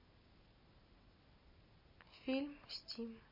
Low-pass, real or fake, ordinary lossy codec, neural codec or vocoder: 5.4 kHz; real; MP3, 24 kbps; none